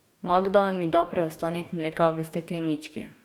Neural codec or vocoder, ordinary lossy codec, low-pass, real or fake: codec, 44.1 kHz, 2.6 kbps, DAC; none; 19.8 kHz; fake